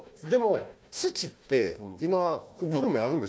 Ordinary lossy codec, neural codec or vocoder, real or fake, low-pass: none; codec, 16 kHz, 1 kbps, FunCodec, trained on Chinese and English, 50 frames a second; fake; none